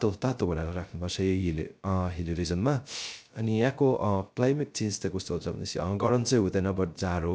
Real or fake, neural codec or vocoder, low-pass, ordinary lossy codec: fake; codec, 16 kHz, 0.3 kbps, FocalCodec; none; none